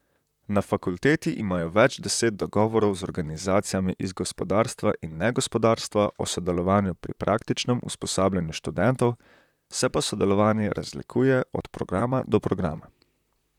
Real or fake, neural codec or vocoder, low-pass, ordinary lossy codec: fake; vocoder, 44.1 kHz, 128 mel bands, Pupu-Vocoder; 19.8 kHz; none